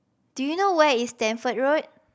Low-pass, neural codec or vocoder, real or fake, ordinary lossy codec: none; none; real; none